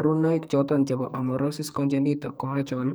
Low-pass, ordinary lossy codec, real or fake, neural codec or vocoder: none; none; fake; codec, 44.1 kHz, 2.6 kbps, SNAC